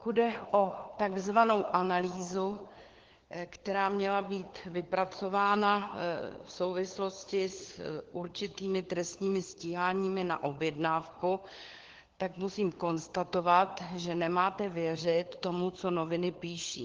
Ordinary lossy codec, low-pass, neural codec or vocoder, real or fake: Opus, 16 kbps; 7.2 kHz; codec, 16 kHz, 4 kbps, FunCodec, trained on LibriTTS, 50 frames a second; fake